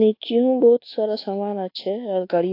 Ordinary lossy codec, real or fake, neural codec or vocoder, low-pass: AAC, 32 kbps; fake; codec, 24 kHz, 1.2 kbps, DualCodec; 5.4 kHz